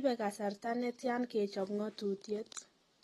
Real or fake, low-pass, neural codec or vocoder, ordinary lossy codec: real; 19.8 kHz; none; AAC, 32 kbps